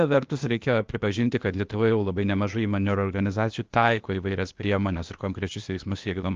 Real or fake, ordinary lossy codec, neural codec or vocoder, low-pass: fake; Opus, 24 kbps; codec, 16 kHz, 0.8 kbps, ZipCodec; 7.2 kHz